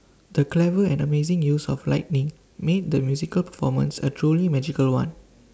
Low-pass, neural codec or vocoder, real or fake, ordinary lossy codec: none; none; real; none